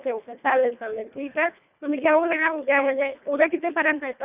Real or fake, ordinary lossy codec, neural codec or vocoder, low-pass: fake; none; codec, 24 kHz, 1.5 kbps, HILCodec; 3.6 kHz